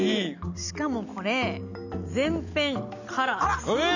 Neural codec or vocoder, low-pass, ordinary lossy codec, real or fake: none; 7.2 kHz; none; real